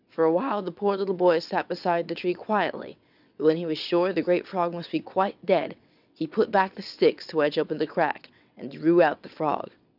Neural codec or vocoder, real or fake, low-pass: vocoder, 22.05 kHz, 80 mel bands, WaveNeXt; fake; 5.4 kHz